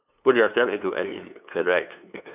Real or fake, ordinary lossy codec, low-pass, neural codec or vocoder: fake; none; 3.6 kHz; codec, 16 kHz, 2 kbps, FunCodec, trained on LibriTTS, 25 frames a second